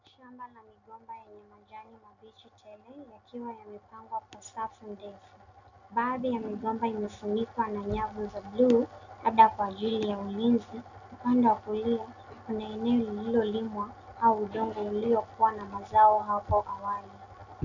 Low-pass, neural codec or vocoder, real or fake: 7.2 kHz; none; real